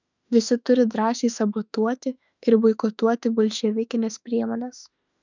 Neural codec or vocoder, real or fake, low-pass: autoencoder, 48 kHz, 32 numbers a frame, DAC-VAE, trained on Japanese speech; fake; 7.2 kHz